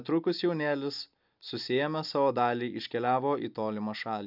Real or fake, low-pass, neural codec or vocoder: real; 5.4 kHz; none